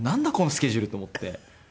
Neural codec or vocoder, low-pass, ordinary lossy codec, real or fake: none; none; none; real